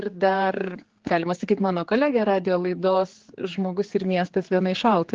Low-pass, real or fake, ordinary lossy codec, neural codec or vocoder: 7.2 kHz; fake; Opus, 16 kbps; codec, 16 kHz, 4 kbps, X-Codec, HuBERT features, trained on general audio